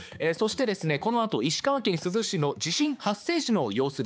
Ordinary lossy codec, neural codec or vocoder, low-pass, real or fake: none; codec, 16 kHz, 4 kbps, X-Codec, HuBERT features, trained on balanced general audio; none; fake